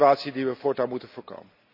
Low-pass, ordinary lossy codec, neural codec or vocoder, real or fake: 5.4 kHz; none; none; real